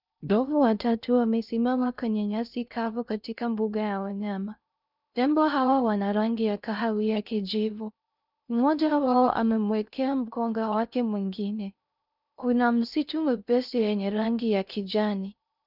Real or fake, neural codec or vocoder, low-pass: fake; codec, 16 kHz in and 24 kHz out, 0.6 kbps, FocalCodec, streaming, 2048 codes; 5.4 kHz